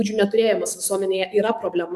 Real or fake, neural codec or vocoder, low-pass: fake; codec, 44.1 kHz, 7.8 kbps, DAC; 14.4 kHz